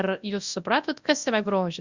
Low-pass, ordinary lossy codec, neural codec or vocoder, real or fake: 7.2 kHz; Opus, 64 kbps; codec, 24 kHz, 0.9 kbps, WavTokenizer, large speech release; fake